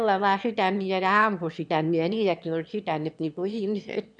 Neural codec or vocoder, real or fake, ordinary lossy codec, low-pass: autoencoder, 22.05 kHz, a latent of 192 numbers a frame, VITS, trained on one speaker; fake; none; 9.9 kHz